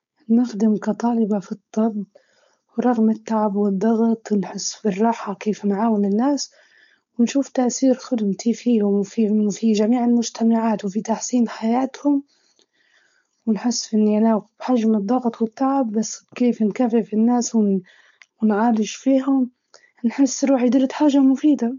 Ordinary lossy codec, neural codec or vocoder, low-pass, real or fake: none; codec, 16 kHz, 4.8 kbps, FACodec; 7.2 kHz; fake